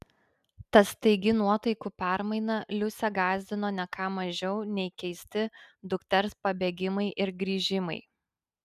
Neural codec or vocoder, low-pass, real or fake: none; 14.4 kHz; real